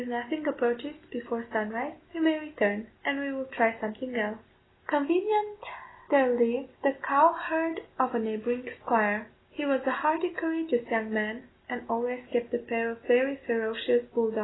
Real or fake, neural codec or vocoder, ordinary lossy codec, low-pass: real; none; AAC, 16 kbps; 7.2 kHz